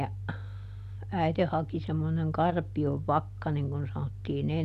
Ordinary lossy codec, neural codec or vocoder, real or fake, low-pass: none; none; real; 14.4 kHz